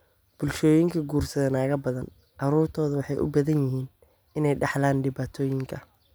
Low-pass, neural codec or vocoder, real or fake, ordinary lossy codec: none; none; real; none